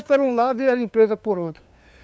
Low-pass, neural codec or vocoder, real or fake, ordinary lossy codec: none; codec, 16 kHz, 2 kbps, FunCodec, trained on LibriTTS, 25 frames a second; fake; none